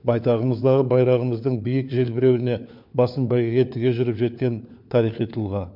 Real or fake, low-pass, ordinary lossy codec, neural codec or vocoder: fake; 5.4 kHz; MP3, 48 kbps; codec, 16 kHz, 4 kbps, FunCodec, trained on LibriTTS, 50 frames a second